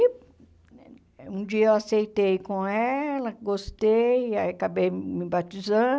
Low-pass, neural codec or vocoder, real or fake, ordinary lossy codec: none; none; real; none